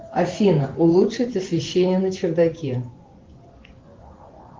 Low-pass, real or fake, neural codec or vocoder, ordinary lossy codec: 7.2 kHz; fake; vocoder, 24 kHz, 100 mel bands, Vocos; Opus, 16 kbps